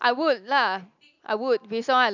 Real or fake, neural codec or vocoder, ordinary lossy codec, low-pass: real; none; none; 7.2 kHz